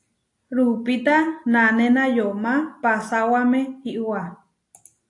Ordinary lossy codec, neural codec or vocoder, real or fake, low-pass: AAC, 48 kbps; none; real; 10.8 kHz